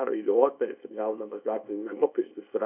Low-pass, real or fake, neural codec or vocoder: 3.6 kHz; fake; codec, 24 kHz, 0.9 kbps, WavTokenizer, small release